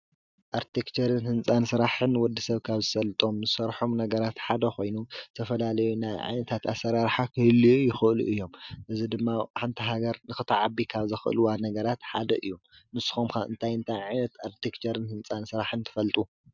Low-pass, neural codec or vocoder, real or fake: 7.2 kHz; none; real